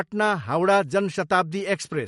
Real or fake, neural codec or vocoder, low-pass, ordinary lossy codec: real; none; 19.8 kHz; MP3, 48 kbps